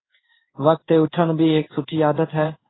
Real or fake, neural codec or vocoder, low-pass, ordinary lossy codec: fake; codec, 16 kHz in and 24 kHz out, 1 kbps, XY-Tokenizer; 7.2 kHz; AAC, 16 kbps